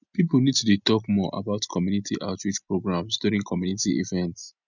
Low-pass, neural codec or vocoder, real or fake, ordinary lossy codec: 7.2 kHz; none; real; none